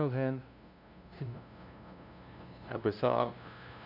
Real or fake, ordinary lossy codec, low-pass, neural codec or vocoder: fake; none; 5.4 kHz; codec, 16 kHz, 0.5 kbps, FunCodec, trained on LibriTTS, 25 frames a second